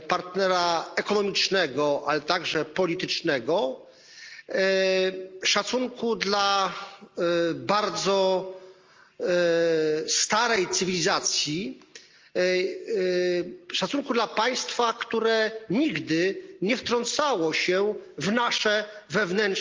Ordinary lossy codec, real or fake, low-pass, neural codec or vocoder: Opus, 32 kbps; real; 7.2 kHz; none